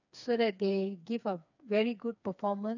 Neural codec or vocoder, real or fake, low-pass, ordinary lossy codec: codec, 16 kHz, 4 kbps, FreqCodec, smaller model; fake; 7.2 kHz; none